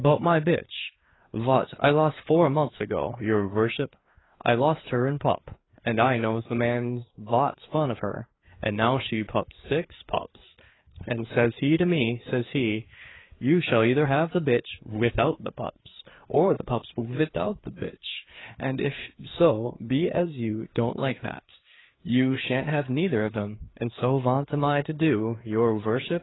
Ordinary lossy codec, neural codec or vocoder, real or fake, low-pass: AAC, 16 kbps; codec, 16 kHz, 4 kbps, FreqCodec, larger model; fake; 7.2 kHz